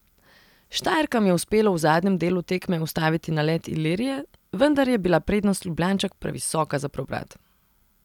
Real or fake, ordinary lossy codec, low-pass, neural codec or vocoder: real; none; 19.8 kHz; none